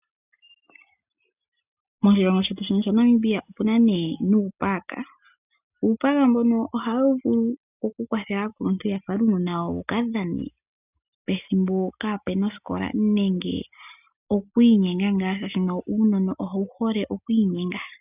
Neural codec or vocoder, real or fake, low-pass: none; real; 3.6 kHz